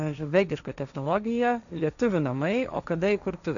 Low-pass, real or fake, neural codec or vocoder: 7.2 kHz; fake; codec, 16 kHz, 1.1 kbps, Voila-Tokenizer